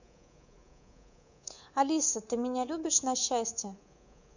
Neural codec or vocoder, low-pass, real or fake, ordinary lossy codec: codec, 24 kHz, 3.1 kbps, DualCodec; 7.2 kHz; fake; none